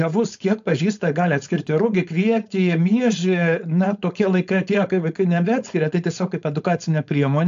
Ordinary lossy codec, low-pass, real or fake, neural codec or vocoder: AAC, 64 kbps; 7.2 kHz; fake; codec, 16 kHz, 4.8 kbps, FACodec